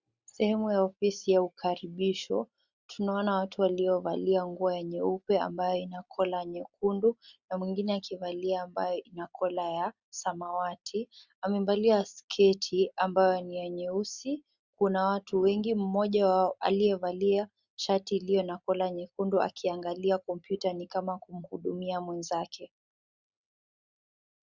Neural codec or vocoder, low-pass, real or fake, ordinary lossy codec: none; 7.2 kHz; real; Opus, 64 kbps